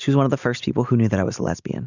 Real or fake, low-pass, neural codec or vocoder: real; 7.2 kHz; none